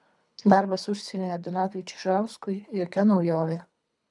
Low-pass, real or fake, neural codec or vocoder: 10.8 kHz; fake; codec, 24 kHz, 3 kbps, HILCodec